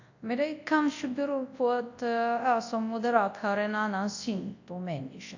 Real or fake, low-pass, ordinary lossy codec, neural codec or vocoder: fake; 7.2 kHz; AAC, 48 kbps; codec, 24 kHz, 0.9 kbps, WavTokenizer, large speech release